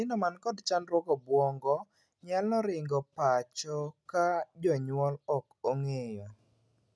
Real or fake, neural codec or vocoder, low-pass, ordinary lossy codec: real; none; none; none